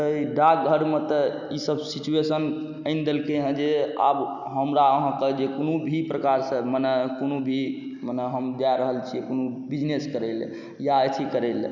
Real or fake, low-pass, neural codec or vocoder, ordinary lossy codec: real; 7.2 kHz; none; none